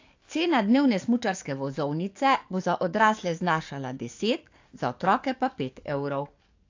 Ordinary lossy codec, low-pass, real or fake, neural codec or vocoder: AAC, 48 kbps; 7.2 kHz; fake; codec, 44.1 kHz, 7.8 kbps, DAC